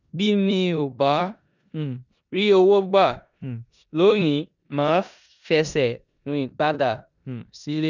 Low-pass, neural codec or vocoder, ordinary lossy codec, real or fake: 7.2 kHz; codec, 16 kHz in and 24 kHz out, 0.9 kbps, LongCat-Audio-Codec, four codebook decoder; none; fake